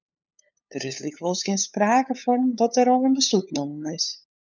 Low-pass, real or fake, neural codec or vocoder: 7.2 kHz; fake; codec, 16 kHz, 8 kbps, FunCodec, trained on LibriTTS, 25 frames a second